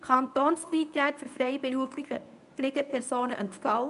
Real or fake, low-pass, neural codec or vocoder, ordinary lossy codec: fake; 10.8 kHz; codec, 24 kHz, 0.9 kbps, WavTokenizer, medium speech release version 1; none